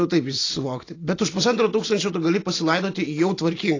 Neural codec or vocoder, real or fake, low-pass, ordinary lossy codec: none; real; 7.2 kHz; AAC, 32 kbps